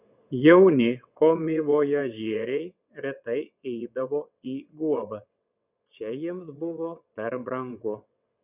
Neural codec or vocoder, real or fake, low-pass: vocoder, 22.05 kHz, 80 mel bands, WaveNeXt; fake; 3.6 kHz